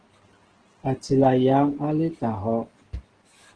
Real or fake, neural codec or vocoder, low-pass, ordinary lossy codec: real; none; 9.9 kHz; Opus, 16 kbps